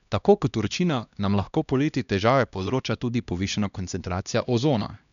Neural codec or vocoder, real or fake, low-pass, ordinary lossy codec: codec, 16 kHz, 1 kbps, X-Codec, HuBERT features, trained on LibriSpeech; fake; 7.2 kHz; none